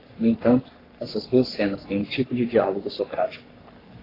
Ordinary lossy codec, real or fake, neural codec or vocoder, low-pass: AAC, 24 kbps; fake; codec, 44.1 kHz, 3.4 kbps, Pupu-Codec; 5.4 kHz